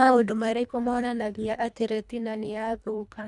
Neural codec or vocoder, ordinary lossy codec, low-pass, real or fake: codec, 24 kHz, 1.5 kbps, HILCodec; none; 10.8 kHz; fake